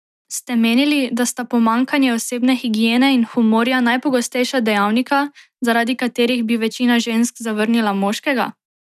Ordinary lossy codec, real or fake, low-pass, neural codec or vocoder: none; real; 14.4 kHz; none